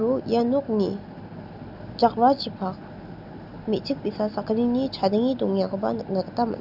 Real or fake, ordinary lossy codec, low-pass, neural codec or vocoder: real; none; 5.4 kHz; none